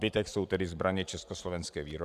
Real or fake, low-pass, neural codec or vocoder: fake; 14.4 kHz; vocoder, 44.1 kHz, 128 mel bands every 512 samples, BigVGAN v2